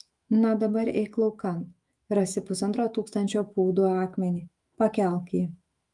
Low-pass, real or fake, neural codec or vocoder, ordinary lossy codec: 10.8 kHz; fake; autoencoder, 48 kHz, 128 numbers a frame, DAC-VAE, trained on Japanese speech; Opus, 32 kbps